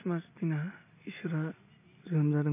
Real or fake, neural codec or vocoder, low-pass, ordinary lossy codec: real; none; 3.6 kHz; none